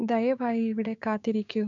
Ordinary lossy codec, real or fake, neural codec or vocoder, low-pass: MP3, 96 kbps; fake; codec, 16 kHz, 6 kbps, DAC; 7.2 kHz